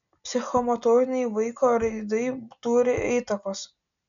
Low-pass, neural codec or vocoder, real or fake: 7.2 kHz; none; real